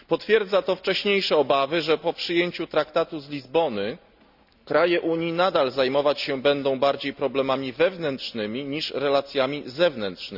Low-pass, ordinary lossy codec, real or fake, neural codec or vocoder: 5.4 kHz; none; real; none